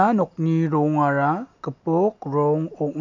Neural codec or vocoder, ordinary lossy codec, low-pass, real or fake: none; none; 7.2 kHz; real